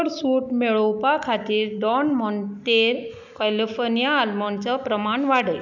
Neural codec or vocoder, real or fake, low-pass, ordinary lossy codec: none; real; 7.2 kHz; none